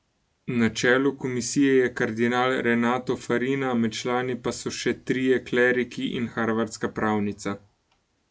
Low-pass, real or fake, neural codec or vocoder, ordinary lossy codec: none; real; none; none